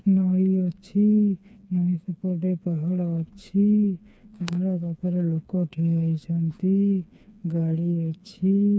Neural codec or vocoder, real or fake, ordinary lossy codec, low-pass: codec, 16 kHz, 4 kbps, FreqCodec, smaller model; fake; none; none